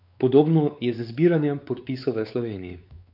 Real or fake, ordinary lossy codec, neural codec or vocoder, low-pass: fake; none; codec, 16 kHz, 4 kbps, X-Codec, WavLM features, trained on Multilingual LibriSpeech; 5.4 kHz